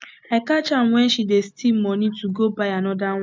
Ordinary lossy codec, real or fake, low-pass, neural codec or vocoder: none; real; none; none